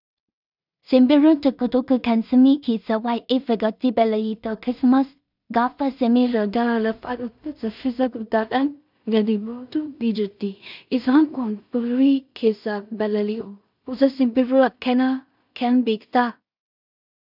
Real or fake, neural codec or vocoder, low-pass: fake; codec, 16 kHz in and 24 kHz out, 0.4 kbps, LongCat-Audio-Codec, two codebook decoder; 5.4 kHz